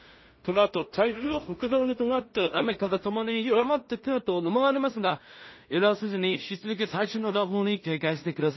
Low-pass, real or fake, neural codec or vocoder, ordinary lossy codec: 7.2 kHz; fake; codec, 16 kHz in and 24 kHz out, 0.4 kbps, LongCat-Audio-Codec, two codebook decoder; MP3, 24 kbps